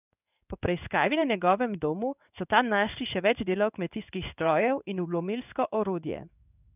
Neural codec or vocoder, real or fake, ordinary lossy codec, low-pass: codec, 16 kHz in and 24 kHz out, 1 kbps, XY-Tokenizer; fake; none; 3.6 kHz